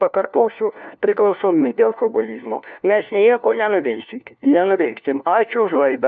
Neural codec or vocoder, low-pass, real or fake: codec, 16 kHz, 1 kbps, FunCodec, trained on LibriTTS, 50 frames a second; 7.2 kHz; fake